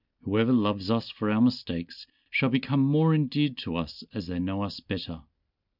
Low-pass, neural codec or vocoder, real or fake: 5.4 kHz; none; real